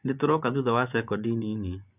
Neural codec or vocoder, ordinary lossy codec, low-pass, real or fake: vocoder, 24 kHz, 100 mel bands, Vocos; none; 3.6 kHz; fake